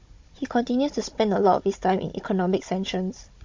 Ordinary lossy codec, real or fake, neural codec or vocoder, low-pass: MP3, 48 kbps; fake; codec, 16 kHz, 16 kbps, FunCodec, trained on Chinese and English, 50 frames a second; 7.2 kHz